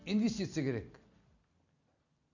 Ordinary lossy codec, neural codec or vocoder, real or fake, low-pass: none; none; real; 7.2 kHz